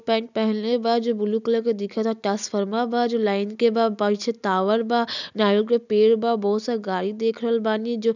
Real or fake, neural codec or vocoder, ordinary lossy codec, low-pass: real; none; none; 7.2 kHz